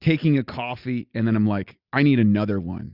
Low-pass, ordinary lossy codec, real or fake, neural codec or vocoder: 5.4 kHz; Opus, 64 kbps; real; none